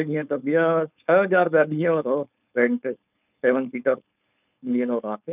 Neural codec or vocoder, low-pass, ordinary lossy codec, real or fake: codec, 16 kHz, 4.8 kbps, FACodec; 3.6 kHz; none; fake